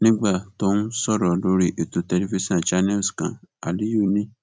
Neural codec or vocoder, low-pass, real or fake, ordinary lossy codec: none; none; real; none